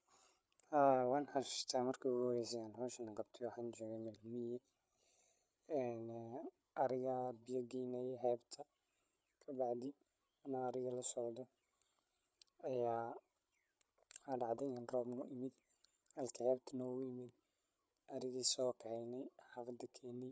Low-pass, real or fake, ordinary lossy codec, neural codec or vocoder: none; fake; none; codec, 16 kHz, 8 kbps, FreqCodec, larger model